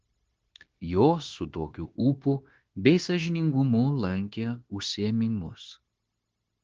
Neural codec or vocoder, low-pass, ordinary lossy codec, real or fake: codec, 16 kHz, 0.9 kbps, LongCat-Audio-Codec; 7.2 kHz; Opus, 16 kbps; fake